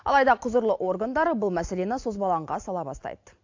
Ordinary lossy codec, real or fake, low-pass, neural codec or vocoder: AAC, 48 kbps; real; 7.2 kHz; none